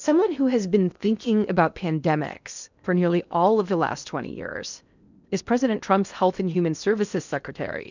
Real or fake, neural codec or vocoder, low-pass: fake; codec, 16 kHz in and 24 kHz out, 0.8 kbps, FocalCodec, streaming, 65536 codes; 7.2 kHz